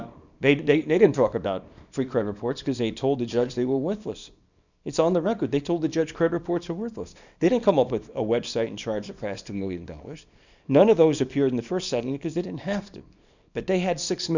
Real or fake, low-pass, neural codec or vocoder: fake; 7.2 kHz; codec, 24 kHz, 0.9 kbps, WavTokenizer, small release